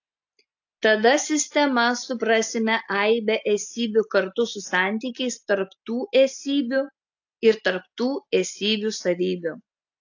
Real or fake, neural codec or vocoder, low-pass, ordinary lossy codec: real; none; 7.2 kHz; AAC, 48 kbps